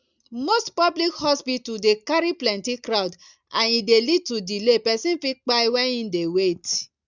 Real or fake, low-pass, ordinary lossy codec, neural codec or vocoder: real; 7.2 kHz; none; none